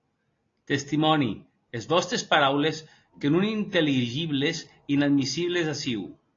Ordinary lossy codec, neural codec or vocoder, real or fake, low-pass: AAC, 32 kbps; none; real; 7.2 kHz